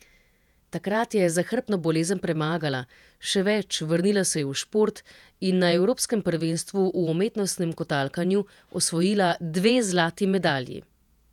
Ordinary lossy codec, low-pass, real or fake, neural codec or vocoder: none; 19.8 kHz; fake; vocoder, 48 kHz, 128 mel bands, Vocos